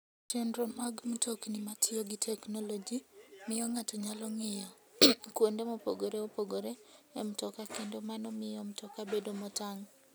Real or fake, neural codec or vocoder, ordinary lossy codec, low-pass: real; none; none; none